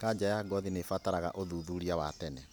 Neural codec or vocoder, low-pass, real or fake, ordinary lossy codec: none; none; real; none